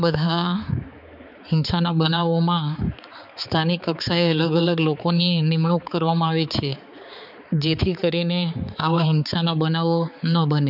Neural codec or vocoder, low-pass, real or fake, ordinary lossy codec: codec, 16 kHz, 4 kbps, X-Codec, HuBERT features, trained on balanced general audio; 5.4 kHz; fake; none